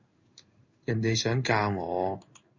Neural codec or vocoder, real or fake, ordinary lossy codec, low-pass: codec, 16 kHz in and 24 kHz out, 1 kbps, XY-Tokenizer; fake; Opus, 64 kbps; 7.2 kHz